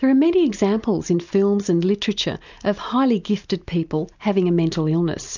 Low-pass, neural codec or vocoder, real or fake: 7.2 kHz; none; real